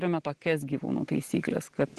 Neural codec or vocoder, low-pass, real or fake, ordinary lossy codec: none; 10.8 kHz; real; Opus, 16 kbps